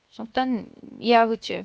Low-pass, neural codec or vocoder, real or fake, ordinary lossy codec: none; codec, 16 kHz, 0.7 kbps, FocalCodec; fake; none